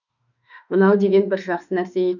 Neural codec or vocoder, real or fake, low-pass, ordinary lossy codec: autoencoder, 48 kHz, 32 numbers a frame, DAC-VAE, trained on Japanese speech; fake; 7.2 kHz; none